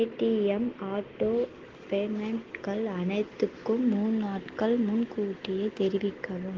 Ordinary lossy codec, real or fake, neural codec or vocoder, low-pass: Opus, 16 kbps; real; none; 7.2 kHz